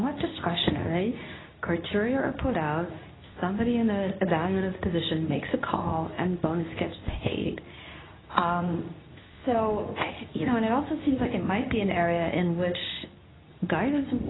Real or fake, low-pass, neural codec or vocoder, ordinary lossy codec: fake; 7.2 kHz; codec, 24 kHz, 0.9 kbps, WavTokenizer, medium speech release version 2; AAC, 16 kbps